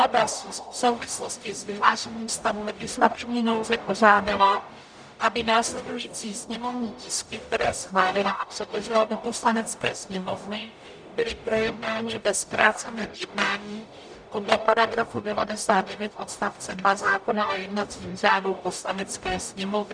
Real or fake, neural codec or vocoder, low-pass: fake; codec, 44.1 kHz, 0.9 kbps, DAC; 9.9 kHz